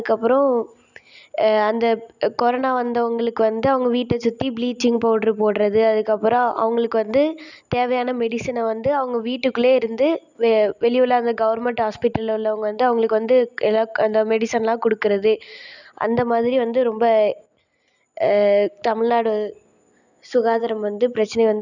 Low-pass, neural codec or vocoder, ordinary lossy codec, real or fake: 7.2 kHz; none; none; real